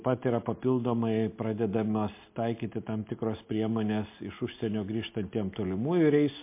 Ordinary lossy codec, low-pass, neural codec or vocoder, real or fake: MP3, 24 kbps; 3.6 kHz; none; real